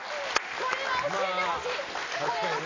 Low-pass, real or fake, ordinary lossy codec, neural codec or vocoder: 7.2 kHz; real; AAC, 32 kbps; none